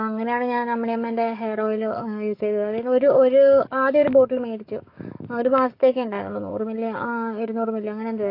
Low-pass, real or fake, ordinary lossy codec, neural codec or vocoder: 5.4 kHz; fake; AAC, 32 kbps; codec, 44.1 kHz, 7.8 kbps, DAC